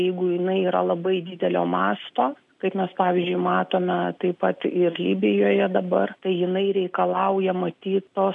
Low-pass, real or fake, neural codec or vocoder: 10.8 kHz; real; none